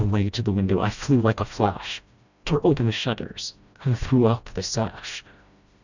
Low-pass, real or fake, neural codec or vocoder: 7.2 kHz; fake; codec, 16 kHz, 1 kbps, FreqCodec, smaller model